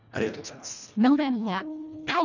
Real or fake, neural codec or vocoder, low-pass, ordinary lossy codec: fake; codec, 24 kHz, 1.5 kbps, HILCodec; 7.2 kHz; none